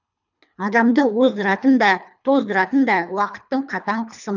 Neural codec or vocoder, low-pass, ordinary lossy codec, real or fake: codec, 24 kHz, 3 kbps, HILCodec; 7.2 kHz; none; fake